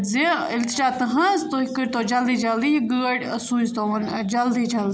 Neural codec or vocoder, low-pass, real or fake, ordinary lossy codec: none; none; real; none